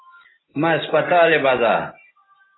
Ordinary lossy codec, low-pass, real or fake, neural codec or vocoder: AAC, 16 kbps; 7.2 kHz; real; none